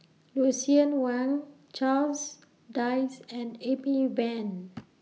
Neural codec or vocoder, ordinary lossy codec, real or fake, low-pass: none; none; real; none